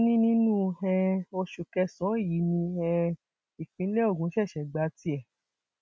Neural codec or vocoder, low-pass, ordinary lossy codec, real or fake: none; none; none; real